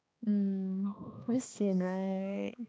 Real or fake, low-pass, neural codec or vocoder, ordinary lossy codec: fake; none; codec, 16 kHz, 2 kbps, X-Codec, HuBERT features, trained on balanced general audio; none